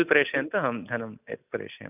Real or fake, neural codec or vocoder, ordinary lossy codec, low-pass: real; none; none; 3.6 kHz